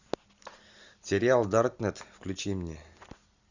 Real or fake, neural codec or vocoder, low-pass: real; none; 7.2 kHz